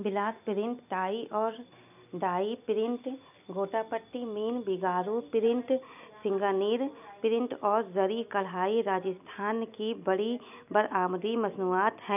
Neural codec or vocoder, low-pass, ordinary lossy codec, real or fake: none; 3.6 kHz; none; real